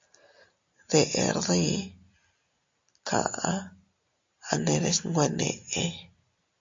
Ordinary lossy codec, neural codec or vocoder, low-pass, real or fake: MP3, 48 kbps; none; 7.2 kHz; real